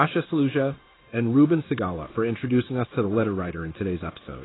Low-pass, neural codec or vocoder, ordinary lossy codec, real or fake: 7.2 kHz; codec, 16 kHz in and 24 kHz out, 1 kbps, XY-Tokenizer; AAC, 16 kbps; fake